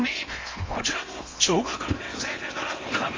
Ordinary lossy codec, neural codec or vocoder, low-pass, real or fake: Opus, 32 kbps; codec, 16 kHz in and 24 kHz out, 0.8 kbps, FocalCodec, streaming, 65536 codes; 7.2 kHz; fake